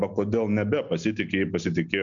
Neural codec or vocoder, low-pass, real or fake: none; 7.2 kHz; real